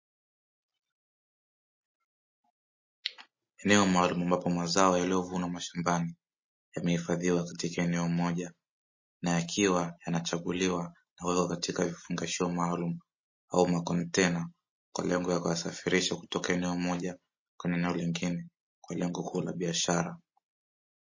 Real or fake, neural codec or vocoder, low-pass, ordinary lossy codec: real; none; 7.2 kHz; MP3, 32 kbps